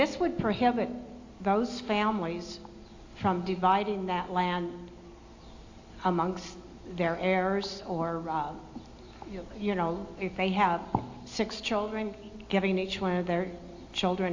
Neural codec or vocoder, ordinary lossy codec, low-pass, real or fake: none; AAC, 48 kbps; 7.2 kHz; real